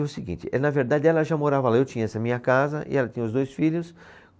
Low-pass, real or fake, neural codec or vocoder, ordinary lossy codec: none; real; none; none